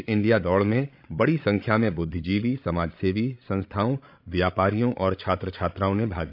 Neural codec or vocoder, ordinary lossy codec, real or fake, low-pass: codec, 16 kHz, 16 kbps, FreqCodec, larger model; none; fake; 5.4 kHz